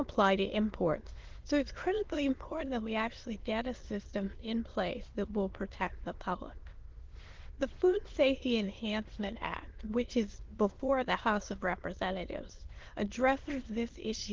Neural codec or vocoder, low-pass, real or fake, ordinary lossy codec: autoencoder, 22.05 kHz, a latent of 192 numbers a frame, VITS, trained on many speakers; 7.2 kHz; fake; Opus, 16 kbps